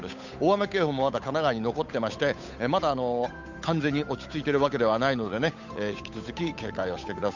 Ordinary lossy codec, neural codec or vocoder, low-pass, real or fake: none; codec, 16 kHz, 8 kbps, FunCodec, trained on Chinese and English, 25 frames a second; 7.2 kHz; fake